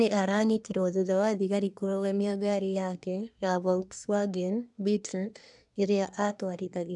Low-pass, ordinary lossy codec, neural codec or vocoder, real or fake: 10.8 kHz; none; codec, 24 kHz, 1 kbps, SNAC; fake